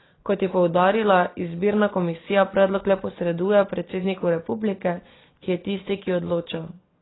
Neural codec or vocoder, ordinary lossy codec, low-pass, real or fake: none; AAC, 16 kbps; 7.2 kHz; real